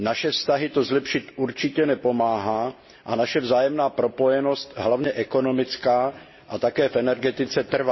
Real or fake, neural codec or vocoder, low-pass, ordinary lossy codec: real; none; 7.2 kHz; MP3, 24 kbps